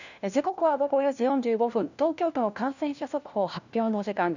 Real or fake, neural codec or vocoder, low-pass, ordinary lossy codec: fake; codec, 16 kHz, 1 kbps, FunCodec, trained on LibriTTS, 50 frames a second; 7.2 kHz; none